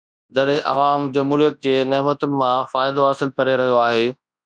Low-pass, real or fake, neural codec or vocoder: 9.9 kHz; fake; codec, 24 kHz, 0.9 kbps, WavTokenizer, large speech release